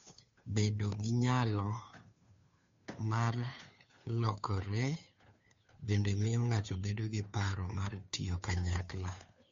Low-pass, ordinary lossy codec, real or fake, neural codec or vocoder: 7.2 kHz; MP3, 48 kbps; fake; codec, 16 kHz, 2 kbps, FunCodec, trained on Chinese and English, 25 frames a second